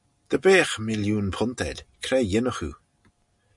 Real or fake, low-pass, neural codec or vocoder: real; 10.8 kHz; none